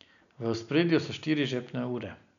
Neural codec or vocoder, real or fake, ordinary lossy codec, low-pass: none; real; none; 7.2 kHz